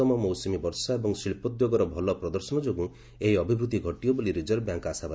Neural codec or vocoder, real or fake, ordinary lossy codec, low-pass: none; real; none; none